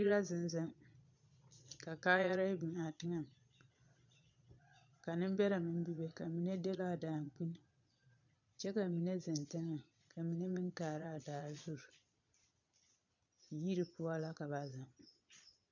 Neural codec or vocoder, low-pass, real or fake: vocoder, 22.05 kHz, 80 mel bands, Vocos; 7.2 kHz; fake